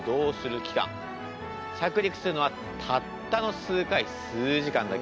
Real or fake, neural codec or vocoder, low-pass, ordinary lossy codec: real; none; none; none